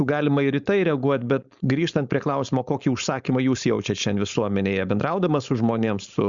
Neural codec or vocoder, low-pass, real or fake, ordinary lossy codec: codec, 16 kHz, 4.8 kbps, FACodec; 7.2 kHz; fake; Opus, 64 kbps